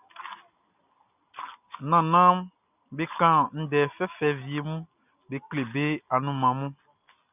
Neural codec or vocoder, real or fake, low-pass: none; real; 3.6 kHz